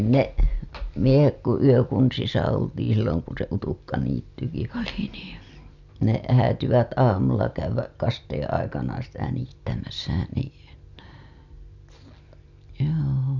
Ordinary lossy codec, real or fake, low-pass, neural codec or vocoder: none; real; 7.2 kHz; none